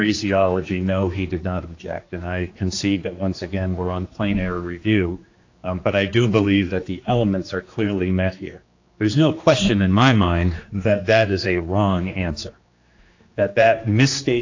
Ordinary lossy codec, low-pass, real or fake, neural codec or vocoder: AAC, 48 kbps; 7.2 kHz; fake; codec, 16 kHz, 2 kbps, X-Codec, HuBERT features, trained on general audio